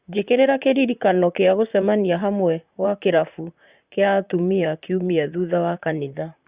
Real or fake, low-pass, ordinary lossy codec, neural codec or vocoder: fake; 3.6 kHz; Opus, 64 kbps; vocoder, 44.1 kHz, 128 mel bands, Pupu-Vocoder